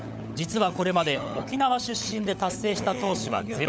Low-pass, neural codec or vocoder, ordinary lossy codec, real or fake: none; codec, 16 kHz, 16 kbps, FunCodec, trained on LibriTTS, 50 frames a second; none; fake